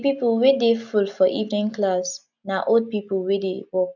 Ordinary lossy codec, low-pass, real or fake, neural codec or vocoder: none; 7.2 kHz; real; none